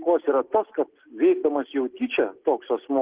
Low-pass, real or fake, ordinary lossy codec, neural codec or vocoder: 3.6 kHz; real; Opus, 16 kbps; none